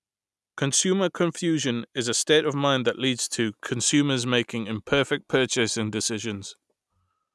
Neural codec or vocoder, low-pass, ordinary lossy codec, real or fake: none; none; none; real